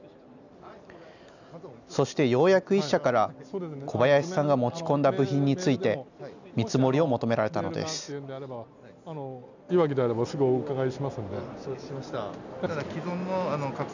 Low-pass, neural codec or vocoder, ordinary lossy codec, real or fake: 7.2 kHz; none; none; real